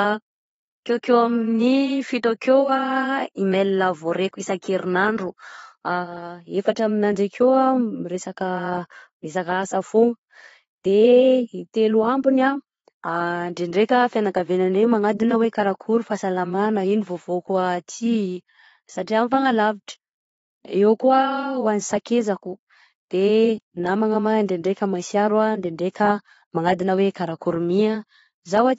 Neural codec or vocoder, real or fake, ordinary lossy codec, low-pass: vocoder, 22.05 kHz, 80 mel bands, Vocos; fake; AAC, 24 kbps; 9.9 kHz